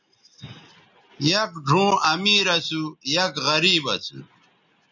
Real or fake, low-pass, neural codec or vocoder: real; 7.2 kHz; none